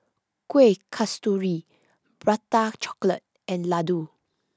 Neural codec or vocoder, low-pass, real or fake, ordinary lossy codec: none; none; real; none